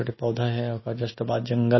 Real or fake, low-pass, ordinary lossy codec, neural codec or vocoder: real; 7.2 kHz; MP3, 24 kbps; none